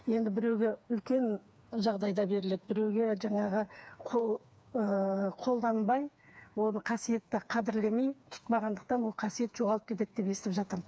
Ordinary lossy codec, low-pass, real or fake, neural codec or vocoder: none; none; fake; codec, 16 kHz, 4 kbps, FreqCodec, smaller model